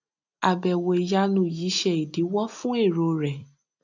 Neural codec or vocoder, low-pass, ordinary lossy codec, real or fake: none; 7.2 kHz; none; real